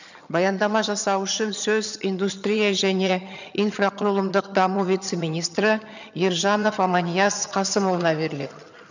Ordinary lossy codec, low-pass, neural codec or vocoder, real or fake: none; 7.2 kHz; vocoder, 22.05 kHz, 80 mel bands, HiFi-GAN; fake